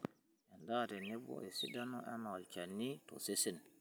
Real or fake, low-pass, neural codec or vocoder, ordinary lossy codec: real; none; none; none